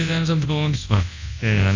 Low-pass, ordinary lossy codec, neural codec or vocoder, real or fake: 7.2 kHz; AAC, 48 kbps; codec, 24 kHz, 0.9 kbps, WavTokenizer, large speech release; fake